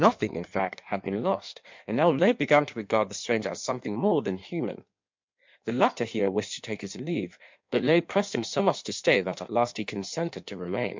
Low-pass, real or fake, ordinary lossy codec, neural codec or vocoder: 7.2 kHz; fake; MP3, 64 kbps; codec, 16 kHz in and 24 kHz out, 1.1 kbps, FireRedTTS-2 codec